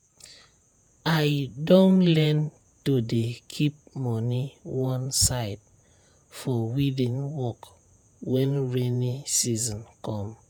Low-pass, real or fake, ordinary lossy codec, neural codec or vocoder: none; fake; none; vocoder, 48 kHz, 128 mel bands, Vocos